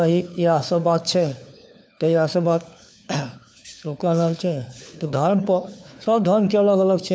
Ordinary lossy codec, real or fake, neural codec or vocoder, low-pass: none; fake; codec, 16 kHz, 4 kbps, FunCodec, trained on LibriTTS, 50 frames a second; none